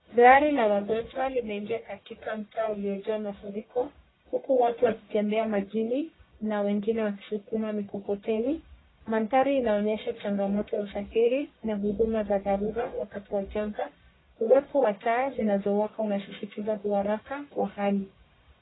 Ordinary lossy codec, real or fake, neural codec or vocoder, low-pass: AAC, 16 kbps; fake; codec, 44.1 kHz, 1.7 kbps, Pupu-Codec; 7.2 kHz